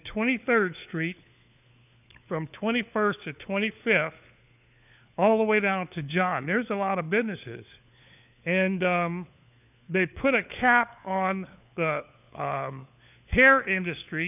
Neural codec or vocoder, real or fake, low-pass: codec, 16 kHz, 4 kbps, FunCodec, trained on LibriTTS, 50 frames a second; fake; 3.6 kHz